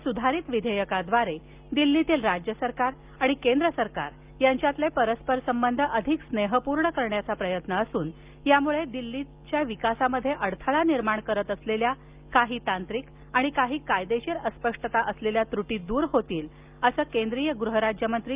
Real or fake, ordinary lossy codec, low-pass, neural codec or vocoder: real; Opus, 32 kbps; 3.6 kHz; none